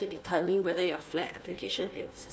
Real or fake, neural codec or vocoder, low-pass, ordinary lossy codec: fake; codec, 16 kHz, 1 kbps, FunCodec, trained on Chinese and English, 50 frames a second; none; none